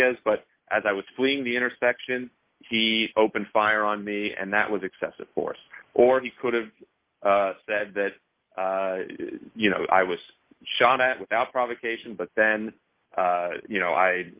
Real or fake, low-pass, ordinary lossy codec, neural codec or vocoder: real; 3.6 kHz; Opus, 32 kbps; none